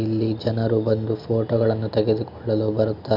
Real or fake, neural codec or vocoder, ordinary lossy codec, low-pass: real; none; none; 5.4 kHz